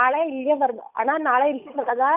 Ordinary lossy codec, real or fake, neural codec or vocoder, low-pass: none; fake; codec, 16 kHz, 8 kbps, FunCodec, trained on Chinese and English, 25 frames a second; 3.6 kHz